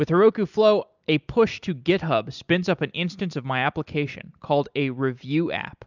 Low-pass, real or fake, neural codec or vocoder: 7.2 kHz; real; none